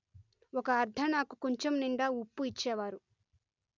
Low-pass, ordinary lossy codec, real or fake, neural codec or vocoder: 7.2 kHz; none; real; none